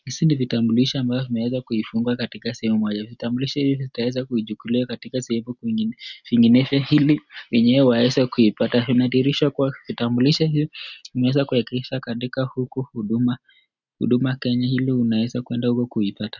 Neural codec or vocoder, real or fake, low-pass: none; real; 7.2 kHz